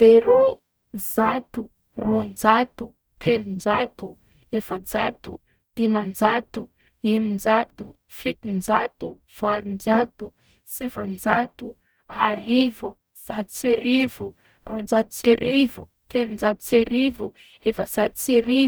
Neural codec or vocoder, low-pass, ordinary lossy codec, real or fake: codec, 44.1 kHz, 0.9 kbps, DAC; none; none; fake